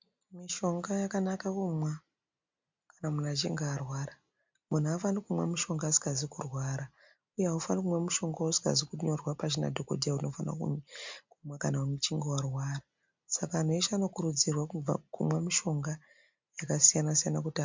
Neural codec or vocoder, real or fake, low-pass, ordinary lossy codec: none; real; 7.2 kHz; MP3, 64 kbps